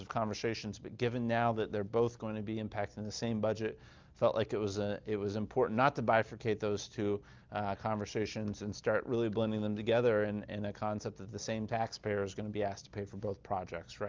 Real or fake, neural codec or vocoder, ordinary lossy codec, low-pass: real; none; Opus, 24 kbps; 7.2 kHz